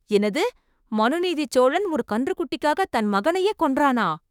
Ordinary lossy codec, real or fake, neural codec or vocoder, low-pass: none; fake; autoencoder, 48 kHz, 32 numbers a frame, DAC-VAE, trained on Japanese speech; 19.8 kHz